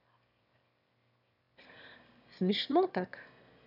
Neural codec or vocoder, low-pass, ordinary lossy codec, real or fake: autoencoder, 22.05 kHz, a latent of 192 numbers a frame, VITS, trained on one speaker; 5.4 kHz; none; fake